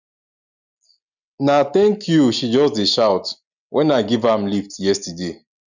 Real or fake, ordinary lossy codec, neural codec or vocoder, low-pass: real; none; none; 7.2 kHz